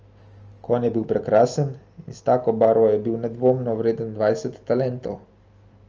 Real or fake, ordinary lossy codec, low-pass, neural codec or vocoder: real; Opus, 24 kbps; 7.2 kHz; none